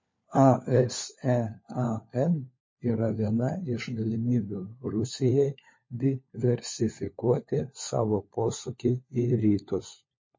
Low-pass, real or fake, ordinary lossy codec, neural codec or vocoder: 7.2 kHz; fake; MP3, 32 kbps; codec, 16 kHz, 4 kbps, FunCodec, trained on LibriTTS, 50 frames a second